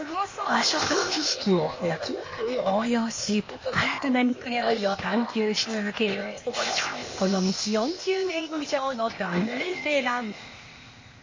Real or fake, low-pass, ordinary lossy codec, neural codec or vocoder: fake; 7.2 kHz; MP3, 32 kbps; codec, 16 kHz, 0.8 kbps, ZipCodec